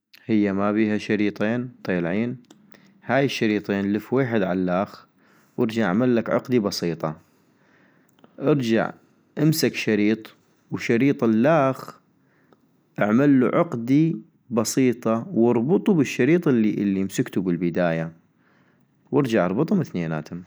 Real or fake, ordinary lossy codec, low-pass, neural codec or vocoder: real; none; none; none